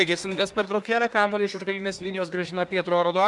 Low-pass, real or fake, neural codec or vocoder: 10.8 kHz; fake; codec, 24 kHz, 1 kbps, SNAC